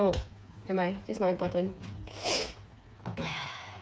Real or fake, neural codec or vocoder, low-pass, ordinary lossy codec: fake; codec, 16 kHz, 4 kbps, FreqCodec, smaller model; none; none